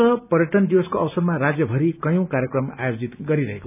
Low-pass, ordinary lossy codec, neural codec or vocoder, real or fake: 3.6 kHz; none; none; real